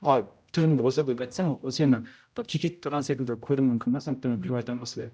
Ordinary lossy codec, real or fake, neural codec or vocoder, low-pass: none; fake; codec, 16 kHz, 0.5 kbps, X-Codec, HuBERT features, trained on general audio; none